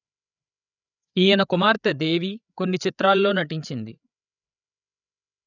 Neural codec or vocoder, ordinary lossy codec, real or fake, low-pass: codec, 16 kHz, 8 kbps, FreqCodec, larger model; none; fake; 7.2 kHz